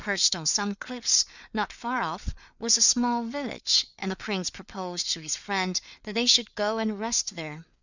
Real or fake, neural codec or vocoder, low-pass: fake; codec, 16 kHz, 4 kbps, FreqCodec, larger model; 7.2 kHz